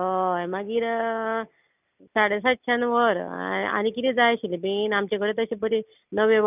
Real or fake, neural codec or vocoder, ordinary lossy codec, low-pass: real; none; none; 3.6 kHz